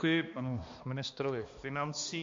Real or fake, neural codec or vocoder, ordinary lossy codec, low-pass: fake; codec, 16 kHz, 2 kbps, X-Codec, HuBERT features, trained on balanced general audio; MP3, 48 kbps; 7.2 kHz